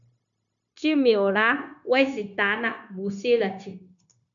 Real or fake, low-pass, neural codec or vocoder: fake; 7.2 kHz; codec, 16 kHz, 0.9 kbps, LongCat-Audio-Codec